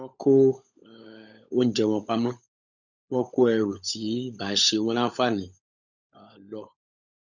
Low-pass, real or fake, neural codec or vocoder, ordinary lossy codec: 7.2 kHz; fake; codec, 16 kHz, 16 kbps, FunCodec, trained on LibriTTS, 50 frames a second; none